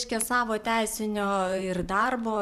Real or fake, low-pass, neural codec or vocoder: fake; 14.4 kHz; vocoder, 44.1 kHz, 128 mel bands every 512 samples, BigVGAN v2